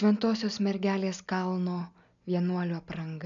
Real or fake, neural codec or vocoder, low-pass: real; none; 7.2 kHz